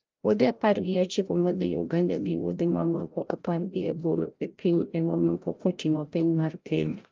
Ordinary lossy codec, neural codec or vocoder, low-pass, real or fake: Opus, 32 kbps; codec, 16 kHz, 0.5 kbps, FreqCodec, larger model; 7.2 kHz; fake